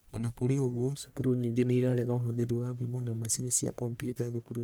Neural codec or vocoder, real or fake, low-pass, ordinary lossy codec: codec, 44.1 kHz, 1.7 kbps, Pupu-Codec; fake; none; none